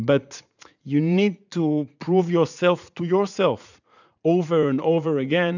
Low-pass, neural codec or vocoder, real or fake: 7.2 kHz; vocoder, 44.1 kHz, 80 mel bands, Vocos; fake